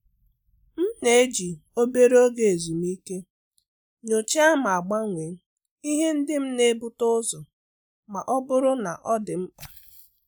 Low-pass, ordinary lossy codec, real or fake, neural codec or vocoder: none; none; real; none